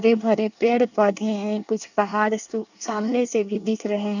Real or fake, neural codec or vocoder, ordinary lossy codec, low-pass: fake; codec, 24 kHz, 1 kbps, SNAC; none; 7.2 kHz